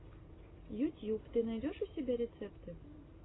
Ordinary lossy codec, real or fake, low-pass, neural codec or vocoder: AAC, 16 kbps; real; 7.2 kHz; none